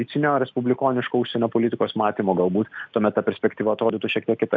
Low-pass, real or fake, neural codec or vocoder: 7.2 kHz; real; none